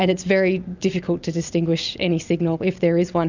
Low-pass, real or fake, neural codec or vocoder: 7.2 kHz; real; none